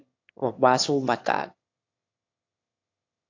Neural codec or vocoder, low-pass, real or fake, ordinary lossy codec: autoencoder, 22.05 kHz, a latent of 192 numbers a frame, VITS, trained on one speaker; 7.2 kHz; fake; AAC, 48 kbps